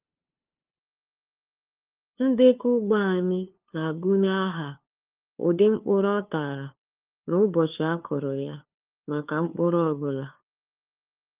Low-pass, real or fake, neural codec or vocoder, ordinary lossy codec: 3.6 kHz; fake; codec, 16 kHz, 2 kbps, FunCodec, trained on LibriTTS, 25 frames a second; Opus, 24 kbps